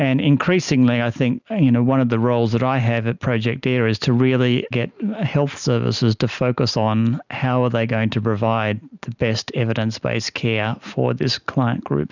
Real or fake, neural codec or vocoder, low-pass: real; none; 7.2 kHz